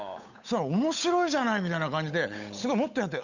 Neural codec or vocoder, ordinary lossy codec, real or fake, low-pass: codec, 16 kHz, 8 kbps, FunCodec, trained on Chinese and English, 25 frames a second; none; fake; 7.2 kHz